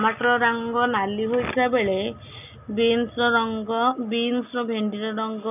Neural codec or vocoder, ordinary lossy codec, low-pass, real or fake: none; none; 3.6 kHz; real